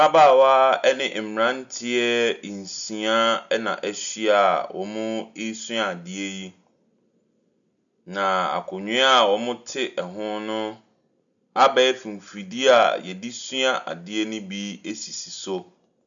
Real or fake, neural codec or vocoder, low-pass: real; none; 7.2 kHz